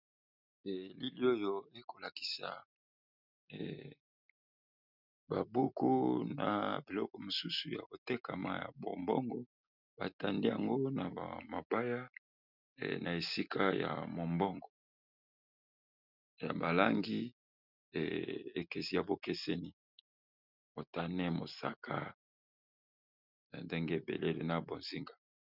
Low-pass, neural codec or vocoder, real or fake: 5.4 kHz; none; real